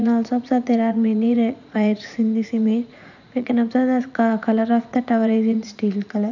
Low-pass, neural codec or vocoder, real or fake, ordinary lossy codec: 7.2 kHz; vocoder, 44.1 kHz, 80 mel bands, Vocos; fake; none